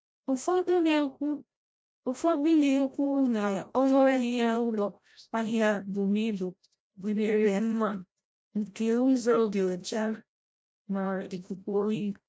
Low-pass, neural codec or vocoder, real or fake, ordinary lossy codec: none; codec, 16 kHz, 0.5 kbps, FreqCodec, larger model; fake; none